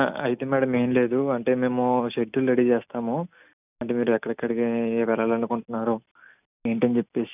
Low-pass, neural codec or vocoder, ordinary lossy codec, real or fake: 3.6 kHz; none; none; real